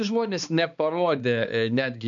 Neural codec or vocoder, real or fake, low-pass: codec, 16 kHz, 2 kbps, X-Codec, HuBERT features, trained on balanced general audio; fake; 7.2 kHz